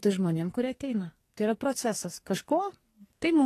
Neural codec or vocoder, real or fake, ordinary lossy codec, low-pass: codec, 44.1 kHz, 2.6 kbps, SNAC; fake; AAC, 48 kbps; 14.4 kHz